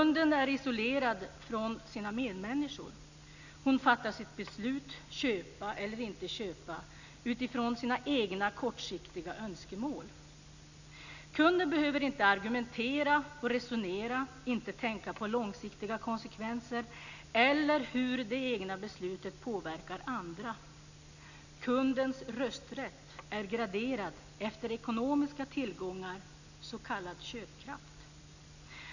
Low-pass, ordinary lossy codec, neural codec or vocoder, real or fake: 7.2 kHz; none; none; real